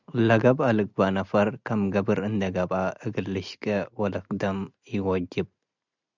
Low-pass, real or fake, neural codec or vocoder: 7.2 kHz; real; none